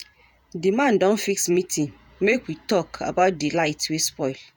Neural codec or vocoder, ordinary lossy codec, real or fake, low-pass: vocoder, 48 kHz, 128 mel bands, Vocos; none; fake; none